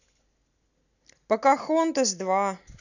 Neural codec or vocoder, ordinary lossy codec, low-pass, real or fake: none; none; 7.2 kHz; real